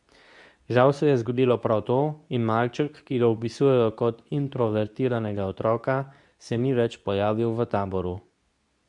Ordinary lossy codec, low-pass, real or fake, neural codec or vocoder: MP3, 96 kbps; 10.8 kHz; fake; codec, 24 kHz, 0.9 kbps, WavTokenizer, medium speech release version 2